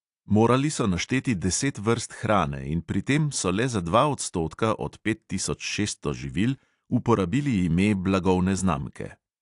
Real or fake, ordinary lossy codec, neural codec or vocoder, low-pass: real; AAC, 64 kbps; none; 10.8 kHz